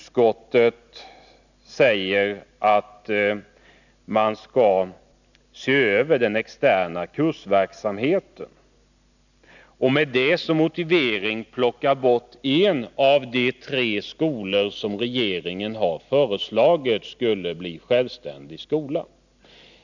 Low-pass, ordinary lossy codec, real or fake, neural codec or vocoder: 7.2 kHz; none; real; none